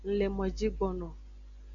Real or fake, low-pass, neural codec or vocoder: real; 7.2 kHz; none